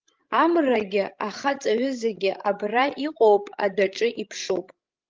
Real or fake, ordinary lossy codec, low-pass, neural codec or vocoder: fake; Opus, 32 kbps; 7.2 kHz; codec, 16 kHz, 16 kbps, FreqCodec, larger model